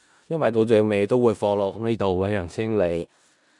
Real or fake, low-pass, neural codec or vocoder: fake; 10.8 kHz; codec, 16 kHz in and 24 kHz out, 0.4 kbps, LongCat-Audio-Codec, four codebook decoder